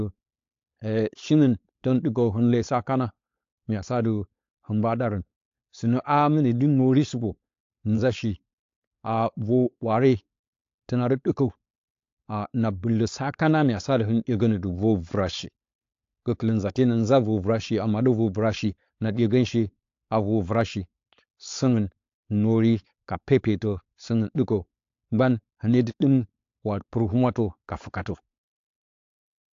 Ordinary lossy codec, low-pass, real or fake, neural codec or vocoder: AAC, 48 kbps; 7.2 kHz; fake; codec, 16 kHz, 4.8 kbps, FACodec